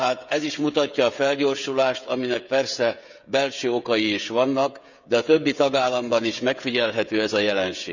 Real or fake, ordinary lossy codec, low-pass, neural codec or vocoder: fake; none; 7.2 kHz; codec, 16 kHz, 16 kbps, FreqCodec, smaller model